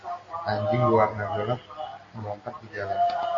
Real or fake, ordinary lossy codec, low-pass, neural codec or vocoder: real; AAC, 32 kbps; 7.2 kHz; none